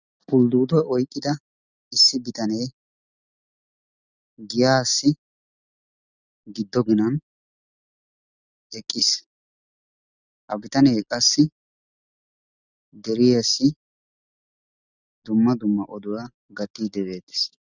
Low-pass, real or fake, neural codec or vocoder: 7.2 kHz; real; none